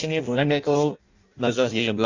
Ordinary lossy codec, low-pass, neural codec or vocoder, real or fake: AAC, 48 kbps; 7.2 kHz; codec, 16 kHz in and 24 kHz out, 0.6 kbps, FireRedTTS-2 codec; fake